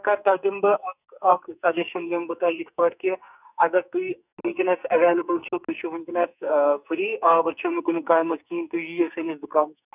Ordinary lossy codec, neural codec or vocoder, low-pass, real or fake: none; codec, 44.1 kHz, 2.6 kbps, SNAC; 3.6 kHz; fake